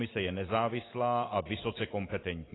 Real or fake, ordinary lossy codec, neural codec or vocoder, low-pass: real; AAC, 16 kbps; none; 7.2 kHz